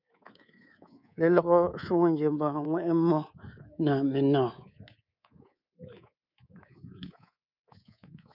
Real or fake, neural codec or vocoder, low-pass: fake; codec, 24 kHz, 3.1 kbps, DualCodec; 5.4 kHz